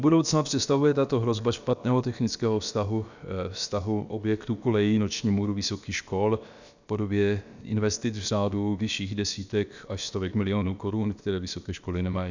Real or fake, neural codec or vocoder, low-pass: fake; codec, 16 kHz, about 1 kbps, DyCAST, with the encoder's durations; 7.2 kHz